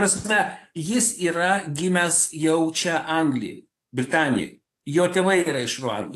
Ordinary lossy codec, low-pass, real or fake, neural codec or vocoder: AAC, 64 kbps; 14.4 kHz; fake; codec, 44.1 kHz, 7.8 kbps, DAC